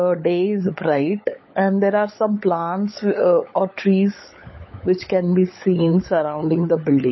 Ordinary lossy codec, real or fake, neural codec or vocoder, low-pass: MP3, 24 kbps; fake; codec, 16 kHz, 16 kbps, FunCodec, trained on LibriTTS, 50 frames a second; 7.2 kHz